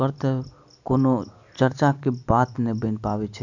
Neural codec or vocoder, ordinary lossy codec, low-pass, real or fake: none; none; 7.2 kHz; real